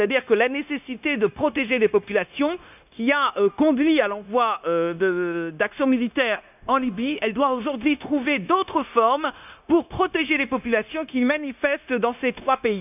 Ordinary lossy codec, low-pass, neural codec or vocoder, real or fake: none; 3.6 kHz; codec, 16 kHz, 0.9 kbps, LongCat-Audio-Codec; fake